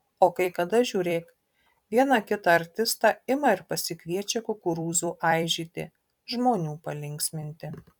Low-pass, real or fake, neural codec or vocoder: 19.8 kHz; fake; vocoder, 44.1 kHz, 128 mel bands every 256 samples, BigVGAN v2